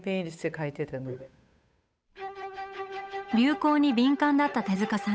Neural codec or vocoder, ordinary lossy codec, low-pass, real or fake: codec, 16 kHz, 8 kbps, FunCodec, trained on Chinese and English, 25 frames a second; none; none; fake